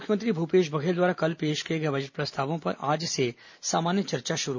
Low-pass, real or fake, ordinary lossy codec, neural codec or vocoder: 7.2 kHz; real; MP3, 64 kbps; none